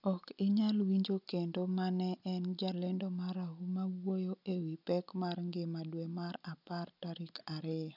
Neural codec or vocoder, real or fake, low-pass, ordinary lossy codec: none; real; 5.4 kHz; none